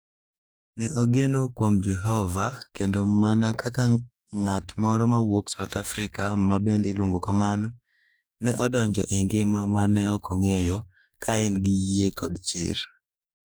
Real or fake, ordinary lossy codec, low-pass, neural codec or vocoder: fake; none; none; codec, 44.1 kHz, 2.6 kbps, DAC